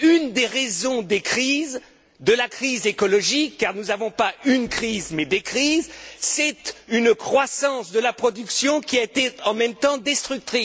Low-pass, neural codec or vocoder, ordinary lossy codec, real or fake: none; none; none; real